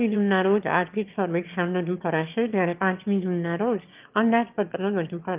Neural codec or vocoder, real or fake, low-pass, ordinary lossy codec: autoencoder, 22.05 kHz, a latent of 192 numbers a frame, VITS, trained on one speaker; fake; 3.6 kHz; Opus, 32 kbps